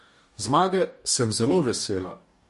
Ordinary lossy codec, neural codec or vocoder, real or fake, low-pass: MP3, 48 kbps; codec, 44.1 kHz, 2.6 kbps, DAC; fake; 14.4 kHz